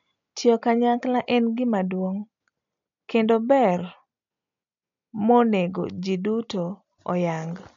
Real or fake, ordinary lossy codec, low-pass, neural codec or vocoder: real; MP3, 64 kbps; 7.2 kHz; none